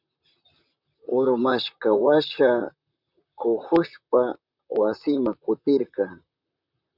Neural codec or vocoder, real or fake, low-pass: vocoder, 44.1 kHz, 128 mel bands, Pupu-Vocoder; fake; 5.4 kHz